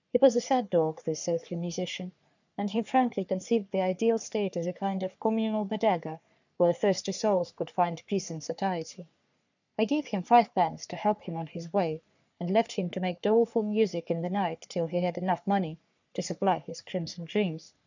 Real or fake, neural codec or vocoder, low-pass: fake; codec, 44.1 kHz, 3.4 kbps, Pupu-Codec; 7.2 kHz